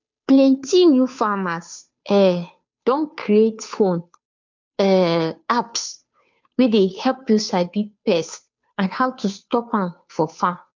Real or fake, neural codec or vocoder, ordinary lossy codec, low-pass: fake; codec, 16 kHz, 2 kbps, FunCodec, trained on Chinese and English, 25 frames a second; MP3, 64 kbps; 7.2 kHz